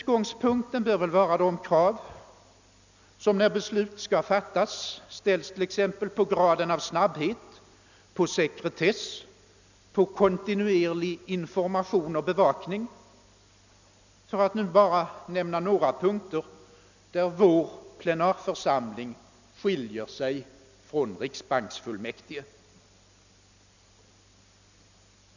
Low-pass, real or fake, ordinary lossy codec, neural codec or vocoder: 7.2 kHz; real; none; none